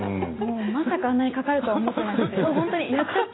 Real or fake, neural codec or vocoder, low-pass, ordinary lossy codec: real; none; 7.2 kHz; AAC, 16 kbps